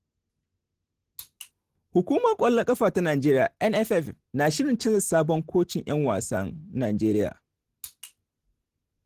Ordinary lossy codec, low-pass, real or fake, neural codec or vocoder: Opus, 16 kbps; 14.4 kHz; real; none